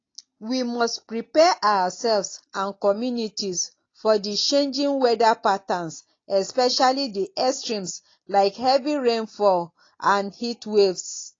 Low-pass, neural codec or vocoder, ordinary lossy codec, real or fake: 7.2 kHz; none; AAC, 32 kbps; real